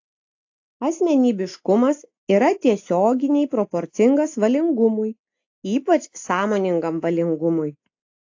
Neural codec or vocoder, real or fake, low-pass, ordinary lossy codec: none; real; 7.2 kHz; AAC, 48 kbps